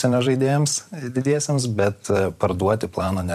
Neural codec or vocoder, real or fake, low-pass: vocoder, 44.1 kHz, 128 mel bands every 512 samples, BigVGAN v2; fake; 14.4 kHz